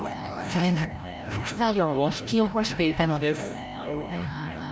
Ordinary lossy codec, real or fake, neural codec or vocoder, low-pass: none; fake; codec, 16 kHz, 0.5 kbps, FreqCodec, larger model; none